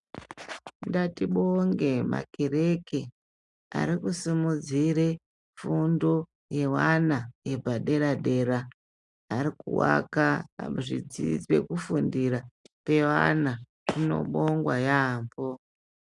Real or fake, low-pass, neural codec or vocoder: real; 10.8 kHz; none